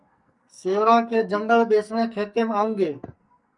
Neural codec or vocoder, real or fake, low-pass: codec, 44.1 kHz, 2.6 kbps, SNAC; fake; 10.8 kHz